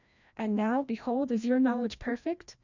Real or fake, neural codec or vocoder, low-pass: fake; codec, 16 kHz, 1 kbps, FreqCodec, larger model; 7.2 kHz